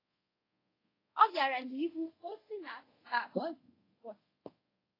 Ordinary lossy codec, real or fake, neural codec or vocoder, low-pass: AAC, 24 kbps; fake; codec, 24 kHz, 0.5 kbps, DualCodec; 5.4 kHz